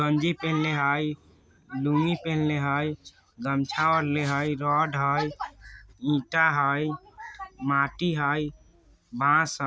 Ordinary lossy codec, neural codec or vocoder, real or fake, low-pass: none; none; real; none